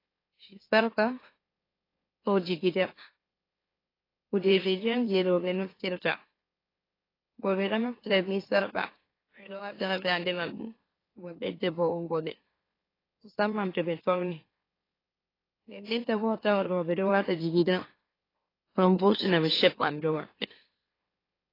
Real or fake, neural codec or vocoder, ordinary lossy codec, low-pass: fake; autoencoder, 44.1 kHz, a latent of 192 numbers a frame, MeloTTS; AAC, 24 kbps; 5.4 kHz